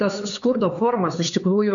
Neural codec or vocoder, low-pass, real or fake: codec, 16 kHz, 4 kbps, X-Codec, HuBERT features, trained on LibriSpeech; 7.2 kHz; fake